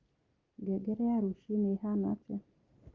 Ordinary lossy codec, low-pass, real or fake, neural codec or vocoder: Opus, 24 kbps; 7.2 kHz; real; none